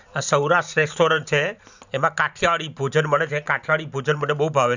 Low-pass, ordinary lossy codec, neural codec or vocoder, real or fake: 7.2 kHz; none; vocoder, 44.1 kHz, 128 mel bands every 512 samples, BigVGAN v2; fake